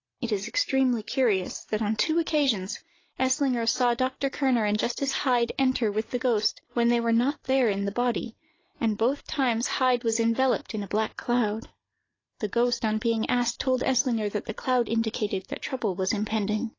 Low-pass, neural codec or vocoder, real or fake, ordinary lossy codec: 7.2 kHz; none; real; AAC, 32 kbps